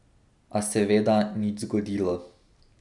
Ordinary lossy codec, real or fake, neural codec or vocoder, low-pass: none; real; none; 10.8 kHz